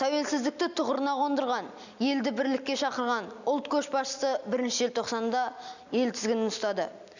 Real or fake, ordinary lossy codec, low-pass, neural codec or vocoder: real; none; 7.2 kHz; none